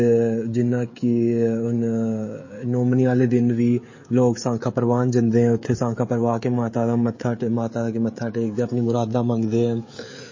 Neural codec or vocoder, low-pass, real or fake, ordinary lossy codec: codec, 16 kHz, 16 kbps, FreqCodec, smaller model; 7.2 kHz; fake; MP3, 32 kbps